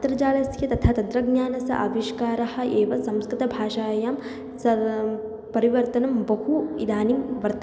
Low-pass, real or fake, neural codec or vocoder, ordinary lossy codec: none; real; none; none